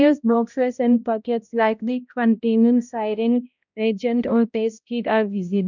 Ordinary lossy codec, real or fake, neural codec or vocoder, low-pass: none; fake; codec, 16 kHz, 0.5 kbps, X-Codec, HuBERT features, trained on balanced general audio; 7.2 kHz